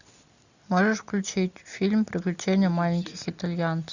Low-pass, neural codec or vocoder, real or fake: 7.2 kHz; none; real